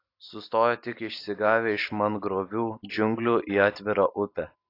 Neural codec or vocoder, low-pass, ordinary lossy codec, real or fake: none; 5.4 kHz; AAC, 32 kbps; real